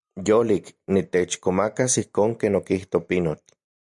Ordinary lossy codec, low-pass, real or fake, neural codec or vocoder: MP3, 96 kbps; 10.8 kHz; real; none